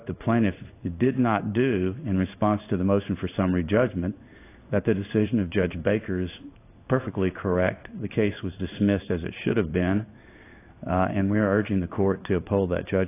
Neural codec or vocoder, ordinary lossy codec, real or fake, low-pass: codec, 16 kHz in and 24 kHz out, 1 kbps, XY-Tokenizer; AAC, 24 kbps; fake; 3.6 kHz